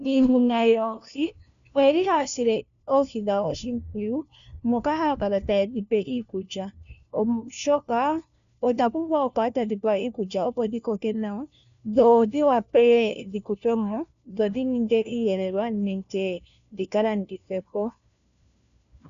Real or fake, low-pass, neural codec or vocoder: fake; 7.2 kHz; codec, 16 kHz, 1 kbps, FunCodec, trained on LibriTTS, 50 frames a second